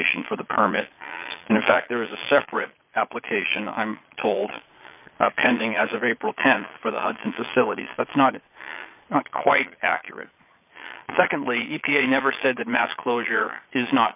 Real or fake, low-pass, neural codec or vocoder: fake; 3.6 kHz; vocoder, 22.05 kHz, 80 mel bands, Vocos